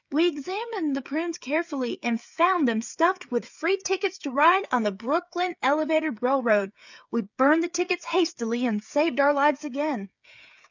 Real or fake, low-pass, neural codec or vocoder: fake; 7.2 kHz; codec, 16 kHz, 8 kbps, FreqCodec, smaller model